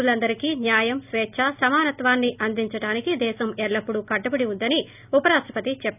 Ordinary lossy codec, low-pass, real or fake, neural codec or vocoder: none; 3.6 kHz; real; none